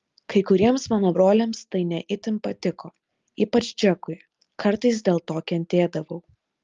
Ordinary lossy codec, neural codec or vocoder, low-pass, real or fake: Opus, 32 kbps; none; 7.2 kHz; real